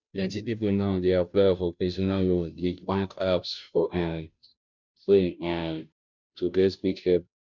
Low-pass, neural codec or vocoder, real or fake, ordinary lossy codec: 7.2 kHz; codec, 16 kHz, 0.5 kbps, FunCodec, trained on Chinese and English, 25 frames a second; fake; none